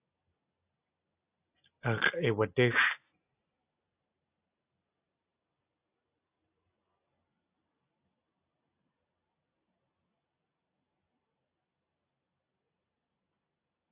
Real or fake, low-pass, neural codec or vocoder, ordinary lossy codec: real; 3.6 kHz; none; AAC, 24 kbps